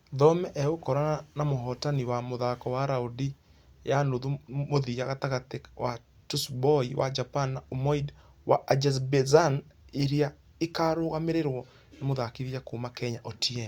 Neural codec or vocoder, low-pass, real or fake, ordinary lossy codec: none; 19.8 kHz; real; Opus, 64 kbps